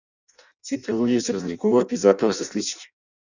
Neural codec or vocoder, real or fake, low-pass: codec, 16 kHz in and 24 kHz out, 0.6 kbps, FireRedTTS-2 codec; fake; 7.2 kHz